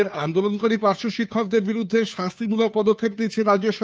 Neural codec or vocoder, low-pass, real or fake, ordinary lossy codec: codec, 16 kHz, 2 kbps, FunCodec, trained on Chinese and English, 25 frames a second; none; fake; none